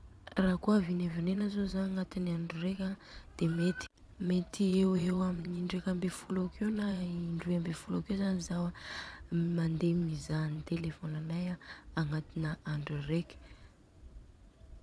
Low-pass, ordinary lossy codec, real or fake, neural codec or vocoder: none; none; fake; vocoder, 22.05 kHz, 80 mel bands, Vocos